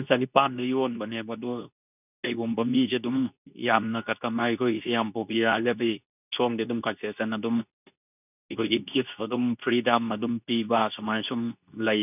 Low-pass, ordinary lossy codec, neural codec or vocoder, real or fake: 3.6 kHz; none; codec, 24 kHz, 0.9 kbps, WavTokenizer, medium speech release version 2; fake